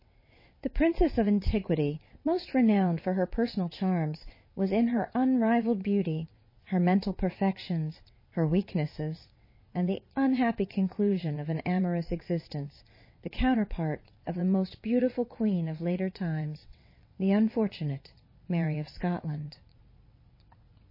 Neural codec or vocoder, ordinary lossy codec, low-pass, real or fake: vocoder, 22.05 kHz, 80 mel bands, WaveNeXt; MP3, 24 kbps; 5.4 kHz; fake